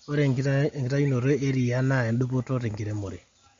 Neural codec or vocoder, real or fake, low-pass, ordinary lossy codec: none; real; 7.2 kHz; MP3, 48 kbps